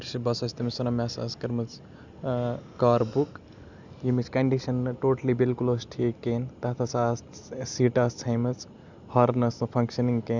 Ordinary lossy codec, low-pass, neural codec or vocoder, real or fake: none; 7.2 kHz; none; real